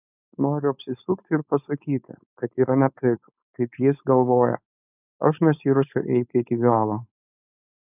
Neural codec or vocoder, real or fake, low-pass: codec, 16 kHz, 4.8 kbps, FACodec; fake; 3.6 kHz